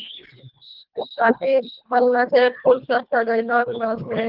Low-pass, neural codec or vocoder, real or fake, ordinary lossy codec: 5.4 kHz; codec, 24 kHz, 1.5 kbps, HILCodec; fake; Opus, 32 kbps